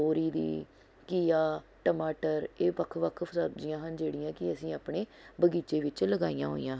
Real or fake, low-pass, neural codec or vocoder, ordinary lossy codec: real; none; none; none